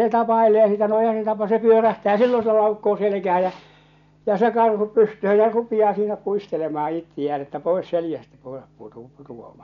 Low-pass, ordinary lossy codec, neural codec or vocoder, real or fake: 7.2 kHz; none; none; real